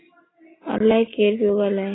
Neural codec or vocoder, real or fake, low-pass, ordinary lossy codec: none; real; 7.2 kHz; AAC, 16 kbps